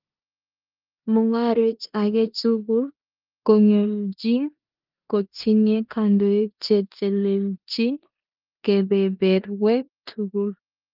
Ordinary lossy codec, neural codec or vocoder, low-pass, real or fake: Opus, 32 kbps; codec, 16 kHz in and 24 kHz out, 0.9 kbps, LongCat-Audio-Codec, four codebook decoder; 5.4 kHz; fake